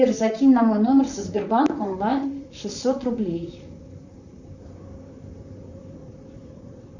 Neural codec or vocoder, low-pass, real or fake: vocoder, 44.1 kHz, 128 mel bands, Pupu-Vocoder; 7.2 kHz; fake